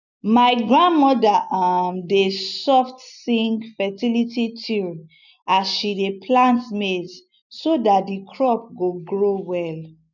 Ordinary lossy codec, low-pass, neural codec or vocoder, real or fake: none; 7.2 kHz; none; real